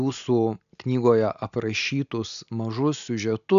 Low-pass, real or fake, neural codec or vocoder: 7.2 kHz; real; none